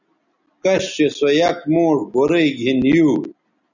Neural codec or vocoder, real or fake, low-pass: none; real; 7.2 kHz